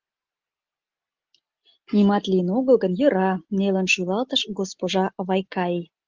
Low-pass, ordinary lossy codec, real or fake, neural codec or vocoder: 7.2 kHz; Opus, 32 kbps; real; none